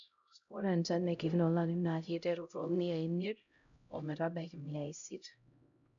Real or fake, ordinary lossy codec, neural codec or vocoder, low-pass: fake; none; codec, 16 kHz, 0.5 kbps, X-Codec, HuBERT features, trained on LibriSpeech; 7.2 kHz